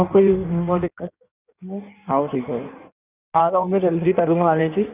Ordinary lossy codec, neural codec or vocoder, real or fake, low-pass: none; codec, 16 kHz in and 24 kHz out, 1.1 kbps, FireRedTTS-2 codec; fake; 3.6 kHz